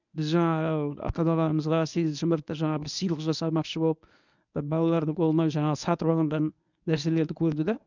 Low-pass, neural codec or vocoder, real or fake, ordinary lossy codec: 7.2 kHz; codec, 24 kHz, 0.9 kbps, WavTokenizer, medium speech release version 1; fake; none